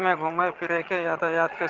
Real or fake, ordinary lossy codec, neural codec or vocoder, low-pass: fake; Opus, 16 kbps; vocoder, 22.05 kHz, 80 mel bands, HiFi-GAN; 7.2 kHz